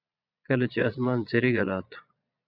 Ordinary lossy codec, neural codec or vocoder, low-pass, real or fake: Opus, 64 kbps; vocoder, 22.05 kHz, 80 mel bands, Vocos; 5.4 kHz; fake